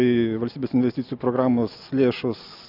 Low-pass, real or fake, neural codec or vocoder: 5.4 kHz; real; none